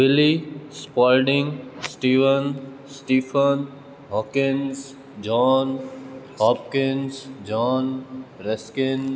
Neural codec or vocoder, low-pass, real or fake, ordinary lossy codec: none; none; real; none